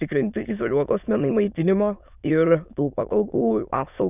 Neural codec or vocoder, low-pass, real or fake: autoencoder, 22.05 kHz, a latent of 192 numbers a frame, VITS, trained on many speakers; 3.6 kHz; fake